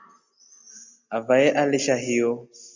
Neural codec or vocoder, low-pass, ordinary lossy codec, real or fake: none; 7.2 kHz; Opus, 64 kbps; real